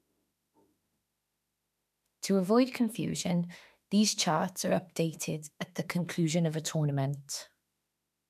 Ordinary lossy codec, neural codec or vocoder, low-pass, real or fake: none; autoencoder, 48 kHz, 32 numbers a frame, DAC-VAE, trained on Japanese speech; 14.4 kHz; fake